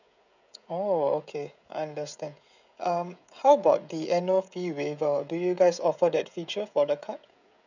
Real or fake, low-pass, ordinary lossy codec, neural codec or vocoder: fake; 7.2 kHz; none; codec, 16 kHz, 16 kbps, FreqCodec, smaller model